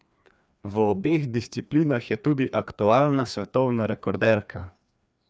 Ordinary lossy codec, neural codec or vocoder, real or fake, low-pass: none; codec, 16 kHz, 2 kbps, FreqCodec, larger model; fake; none